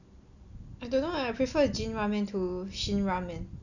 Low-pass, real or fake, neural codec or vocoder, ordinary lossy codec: 7.2 kHz; real; none; none